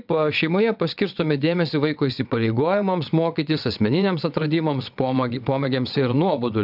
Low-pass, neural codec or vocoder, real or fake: 5.4 kHz; vocoder, 22.05 kHz, 80 mel bands, WaveNeXt; fake